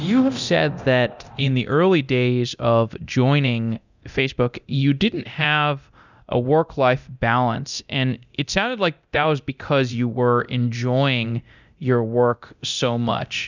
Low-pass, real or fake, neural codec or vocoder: 7.2 kHz; fake; codec, 24 kHz, 0.9 kbps, DualCodec